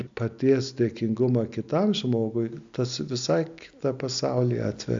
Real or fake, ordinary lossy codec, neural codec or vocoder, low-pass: real; AAC, 64 kbps; none; 7.2 kHz